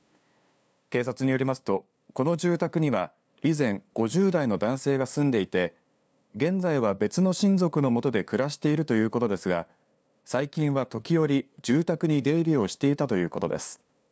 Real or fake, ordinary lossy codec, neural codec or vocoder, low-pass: fake; none; codec, 16 kHz, 2 kbps, FunCodec, trained on LibriTTS, 25 frames a second; none